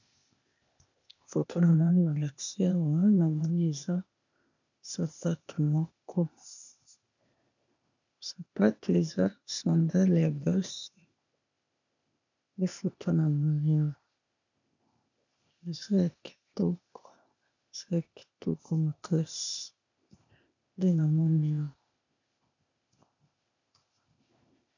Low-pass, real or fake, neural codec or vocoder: 7.2 kHz; fake; codec, 16 kHz, 0.8 kbps, ZipCodec